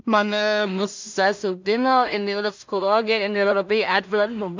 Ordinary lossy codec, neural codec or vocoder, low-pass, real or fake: MP3, 64 kbps; codec, 16 kHz in and 24 kHz out, 0.4 kbps, LongCat-Audio-Codec, two codebook decoder; 7.2 kHz; fake